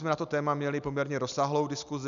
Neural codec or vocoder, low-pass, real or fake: none; 7.2 kHz; real